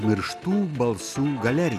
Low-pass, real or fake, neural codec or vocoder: 14.4 kHz; real; none